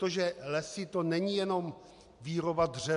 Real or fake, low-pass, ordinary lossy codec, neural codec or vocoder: real; 10.8 kHz; MP3, 64 kbps; none